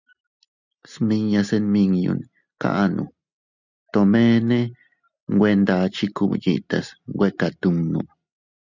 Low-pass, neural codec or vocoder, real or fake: 7.2 kHz; none; real